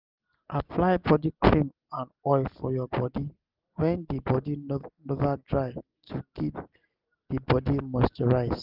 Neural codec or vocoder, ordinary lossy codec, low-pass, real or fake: none; Opus, 32 kbps; 5.4 kHz; real